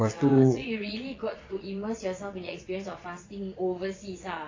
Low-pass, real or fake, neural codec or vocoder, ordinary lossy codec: 7.2 kHz; fake; vocoder, 22.05 kHz, 80 mel bands, Vocos; AAC, 32 kbps